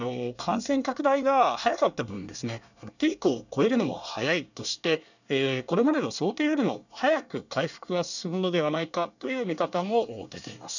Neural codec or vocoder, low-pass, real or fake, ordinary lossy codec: codec, 24 kHz, 1 kbps, SNAC; 7.2 kHz; fake; none